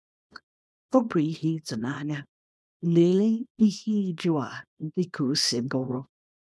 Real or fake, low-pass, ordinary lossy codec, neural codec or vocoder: fake; none; none; codec, 24 kHz, 0.9 kbps, WavTokenizer, small release